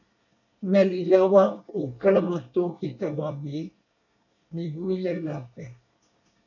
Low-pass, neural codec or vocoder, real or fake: 7.2 kHz; codec, 24 kHz, 1 kbps, SNAC; fake